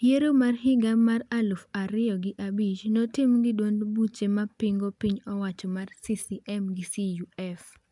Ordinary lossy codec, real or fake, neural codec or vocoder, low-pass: MP3, 96 kbps; real; none; 10.8 kHz